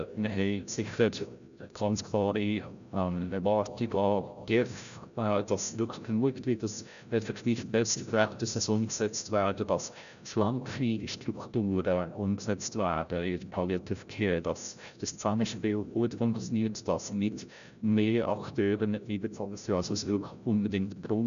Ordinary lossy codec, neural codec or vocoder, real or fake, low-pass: none; codec, 16 kHz, 0.5 kbps, FreqCodec, larger model; fake; 7.2 kHz